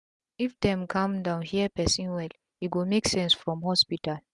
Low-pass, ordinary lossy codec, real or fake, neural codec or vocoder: 10.8 kHz; Opus, 64 kbps; real; none